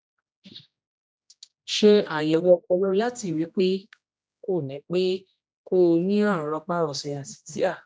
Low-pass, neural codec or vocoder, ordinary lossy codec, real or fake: none; codec, 16 kHz, 1 kbps, X-Codec, HuBERT features, trained on general audio; none; fake